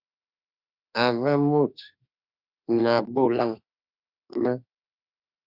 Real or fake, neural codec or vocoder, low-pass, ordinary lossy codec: fake; autoencoder, 48 kHz, 32 numbers a frame, DAC-VAE, trained on Japanese speech; 5.4 kHz; Opus, 64 kbps